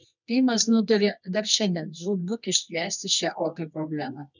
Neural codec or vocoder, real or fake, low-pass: codec, 24 kHz, 0.9 kbps, WavTokenizer, medium music audio release; fake; 7.2 kHz